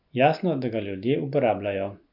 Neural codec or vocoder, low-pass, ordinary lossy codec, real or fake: none; 5.4 kHz; none; real